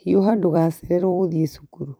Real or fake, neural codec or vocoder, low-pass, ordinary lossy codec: fake; vocoder, 44.1 kHz, 128 mel bands every 256 samples, BigVGAN v2; none; none